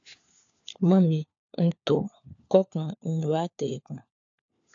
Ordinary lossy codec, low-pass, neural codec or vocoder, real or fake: AAC, 64 kbps; 7.2 kHz; codec, 16 kHz, 4 kbps, FunCodec, trained on LibriTTS, 50 frames a second; fake